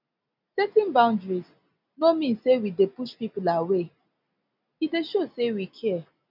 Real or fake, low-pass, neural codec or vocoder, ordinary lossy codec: real; 5.4 kHz; none; none